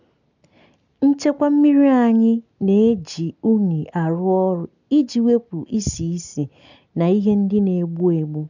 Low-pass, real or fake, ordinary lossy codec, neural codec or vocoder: 7.2 kHz; real; none; none